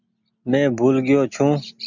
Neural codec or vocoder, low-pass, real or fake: none; 7.2 kHz; real